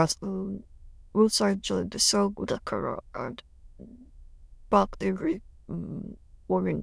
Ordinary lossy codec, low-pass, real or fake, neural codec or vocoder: none; none; fake; autoencoder, 22.05 kHz, a latent of 192 numbers a frame, VITS, trained on many speakers